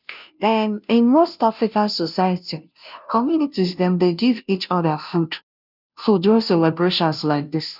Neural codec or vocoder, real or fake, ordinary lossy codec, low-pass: codec, 16 kHz, 0.5 kbps, FunCodec, trained on Chinese and English, 25 frames a second; fake; none; 5.4 kHz